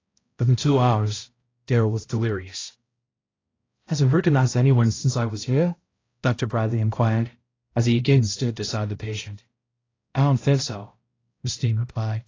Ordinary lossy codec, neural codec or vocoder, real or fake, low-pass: AAC, 32 kbps; codec, 16 kHz, 0.5 kbps, X-Codec, HuBERT features, trained on balanced general audio; fake; 7.2 kHz